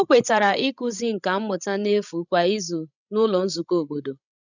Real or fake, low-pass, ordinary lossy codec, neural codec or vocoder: fake; 7.2 kHz; none; codec, 16 kHz, 16 kbps, FreqCodec, larger model